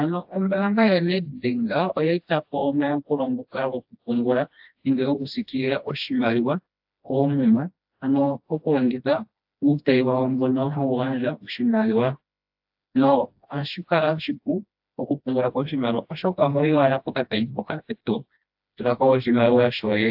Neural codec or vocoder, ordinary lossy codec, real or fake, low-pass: codec, 16 kHz, 1 kbps, FreqCodec, smaller model; AAC, 48 kbps; fake; 5.4 kHz